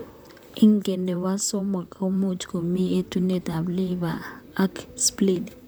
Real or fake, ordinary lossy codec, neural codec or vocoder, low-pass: fake; none; vocoder, 44.1 kHz, 128 mel bands, Pupu-Vocoder; none